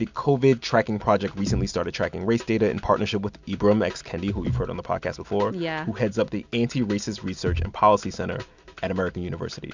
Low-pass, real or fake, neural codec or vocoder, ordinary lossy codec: 7.2 kHz; real; none; MP3, 64 kbps